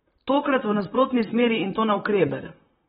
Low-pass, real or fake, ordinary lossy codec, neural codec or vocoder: 19.8 kHz; fake; AAC, 16 kbps; vocoder, 44.1 kHz, 128 mel bands, Pupu-Vocoder